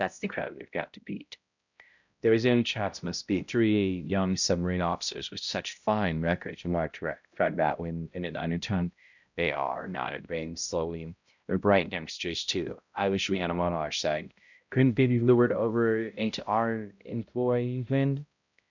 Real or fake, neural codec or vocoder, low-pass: fake; codec, 16 kHz, 0.5 kbps, X-Codec, HuBERT features, trained on balanced general audio; 7.2 kHz